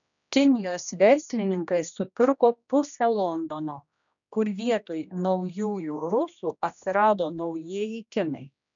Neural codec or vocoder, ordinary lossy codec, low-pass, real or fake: codec, 16 kHz, 1 kbps, X-Codec, HuBERT features, trained on general audio; MP3, 96 kbps; 7.2 kHz; fake